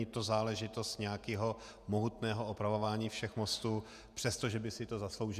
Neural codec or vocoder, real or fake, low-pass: vocoder, 48 kHz, 128 mel bands, Vocos; fake; 14.4 kHz